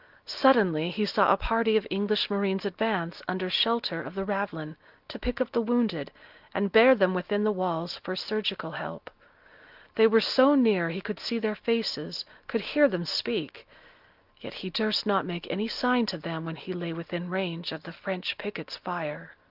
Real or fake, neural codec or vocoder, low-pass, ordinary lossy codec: real; none; 5.4 kHz; Opus, 32 kbps